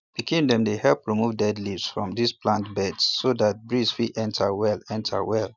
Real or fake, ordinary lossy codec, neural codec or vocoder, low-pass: real; none; none; 7.2 kHz